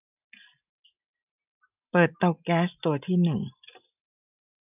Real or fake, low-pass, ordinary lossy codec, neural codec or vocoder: real; 3.6 kHz; none; none